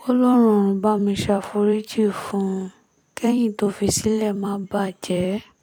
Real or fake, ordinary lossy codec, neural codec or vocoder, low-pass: fake; none; vocoder, 44.1 kHz, 128 mel bands every 256 samples, BigVGAN v2; 19.8 kHz